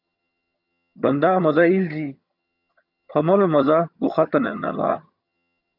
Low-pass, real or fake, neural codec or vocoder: 5.4 kHz; fake; vocoder, 22.05 kHz, 80 mel bands, HiFi-GAN